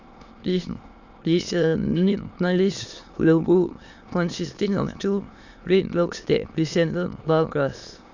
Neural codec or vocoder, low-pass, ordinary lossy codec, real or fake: autoencoder, 22.05 kHz, a latent of 192 numbers a frame, VITS, trained on many speakers; 7.2 kHz; Opus, 64 kbps; fake